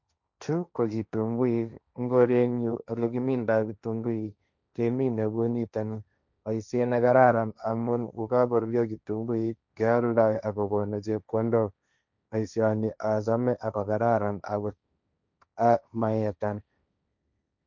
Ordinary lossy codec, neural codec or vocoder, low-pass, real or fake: none; codec, 16 kHz, 1.1 kbps, Voila-Tokenizer; 7.2 kHz; fake